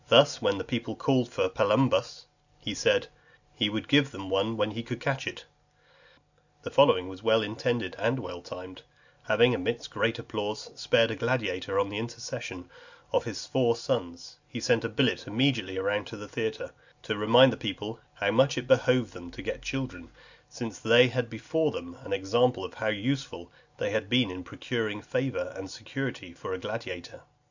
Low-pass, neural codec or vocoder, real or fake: 7.2 kHz; none; real